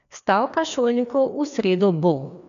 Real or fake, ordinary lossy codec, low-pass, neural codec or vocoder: fake; none; 7.2 kHz; codec, 16 kHz, 2 kbps, FreqCodec, larger model